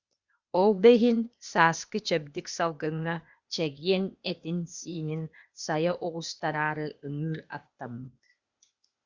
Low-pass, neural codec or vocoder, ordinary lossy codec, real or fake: 7.2 kHz; codec, 16 kHz, 0.8 kbps, ZipCodec; Opus, 64 kbps; fake